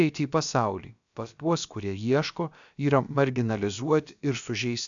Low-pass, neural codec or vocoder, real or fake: 7.2 kHz; codec, 16 kHz, about 1 kbps, DyCAST, with the encoder's durations; fake